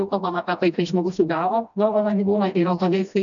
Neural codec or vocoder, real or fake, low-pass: codec, 16 kHz, 1 kbps, FreqCodec, smaller model; fake; 7.2 kHz